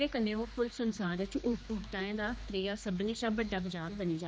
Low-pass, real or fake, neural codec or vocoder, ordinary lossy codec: none; fake; codec, 16 kHz, 2 kbps, X-Codec, HuBERT features, trained on general audio; none